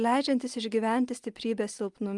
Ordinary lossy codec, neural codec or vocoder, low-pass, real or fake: Opus, 32 kbps; none; 10.8 kHz; real